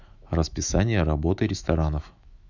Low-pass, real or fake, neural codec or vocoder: 7.2 kHz; fake; autoencoder, 48 kHz, 128 numbers a frame, DAC-VAE, trained on Japanese speech